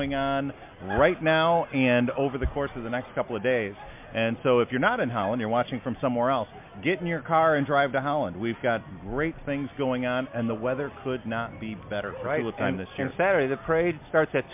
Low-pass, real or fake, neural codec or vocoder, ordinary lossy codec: 3.6 kHz; real; none; MP3, 32 kbps